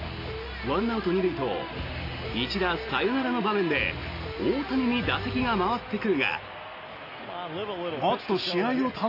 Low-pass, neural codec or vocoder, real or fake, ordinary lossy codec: 5.4 kHz; none; real; AAC, 32 kbps